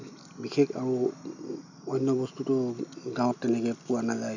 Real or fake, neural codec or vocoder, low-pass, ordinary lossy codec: real; none; 7.2 kHz; none